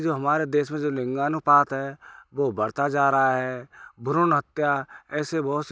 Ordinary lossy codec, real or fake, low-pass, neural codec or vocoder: none; real; none; none